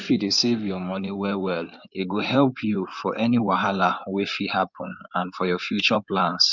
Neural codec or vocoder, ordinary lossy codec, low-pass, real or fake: codec, 16 kHz in and 24 kHz out, 2.2 kbps, FireRedTTS-2 codec; none; 7.2 kHz; fake